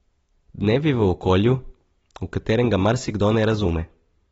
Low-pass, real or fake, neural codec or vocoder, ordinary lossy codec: 14.4 kHz; real; none; AAC, 24 kbps